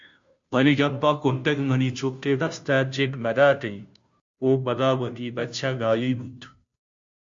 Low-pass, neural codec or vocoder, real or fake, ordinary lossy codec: 7.2 kHz; codec, 16 kHz, 0.5 kbps, FunCodec, trained on Chinese and English, 25 frames a second; fake; MP3, 48 kbps